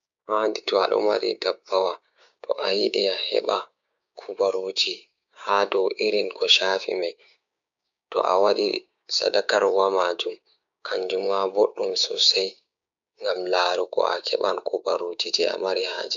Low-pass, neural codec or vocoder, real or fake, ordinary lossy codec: 7.2 kHz; codec, 16 kHz, 6 kbps, DAC; fake; none